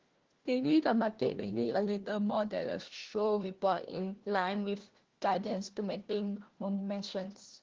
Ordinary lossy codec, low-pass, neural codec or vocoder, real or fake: Opus, 16 kbps; 7.2 kHz; codec, 16 kHz, 1 kbps, FunCodec, trained on LibriTTS, 50 frames a second; fake